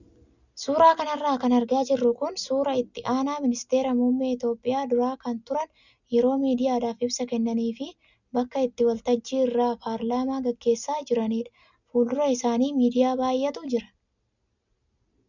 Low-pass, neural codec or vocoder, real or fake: 7.2 kHz; none; real